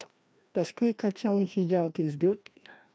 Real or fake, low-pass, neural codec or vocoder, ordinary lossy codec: fake; none; codec, 16 kHz, 1 kbps, FreqCodec, larger model; none